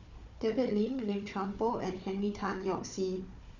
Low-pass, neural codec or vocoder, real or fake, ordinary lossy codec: 7.2 kHz; codec, 16 kHz, 4 kbps, FunCodec, trained on Chinese and English, 50 frames a second; fake; none